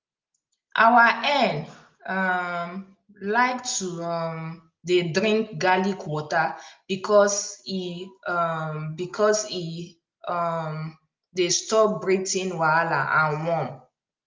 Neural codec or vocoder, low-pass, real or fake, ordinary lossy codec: none; 7.2 kHz; real; Opus, 24 kbps